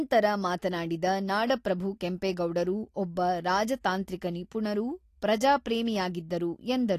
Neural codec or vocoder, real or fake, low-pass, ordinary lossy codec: none; real; 14.4 kHz; AAC, 48 kbps